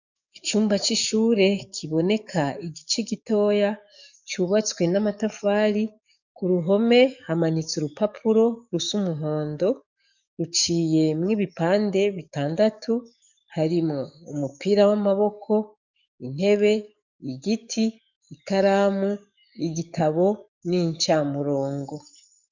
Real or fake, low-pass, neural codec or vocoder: fake; 7.2 kHz; codec, 44.1 kHz, 7.8 kbps, DAC